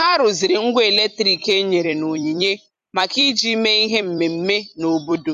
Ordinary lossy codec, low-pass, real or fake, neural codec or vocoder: none; 14.4 kHz; fake; vocoder, 44.1 kHz, 128 mel bands every 256 samples, BigVGAN v2